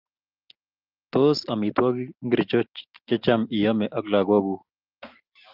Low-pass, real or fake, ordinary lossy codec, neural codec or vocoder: 5.4 kHz; real; Opus, 24 kbps; none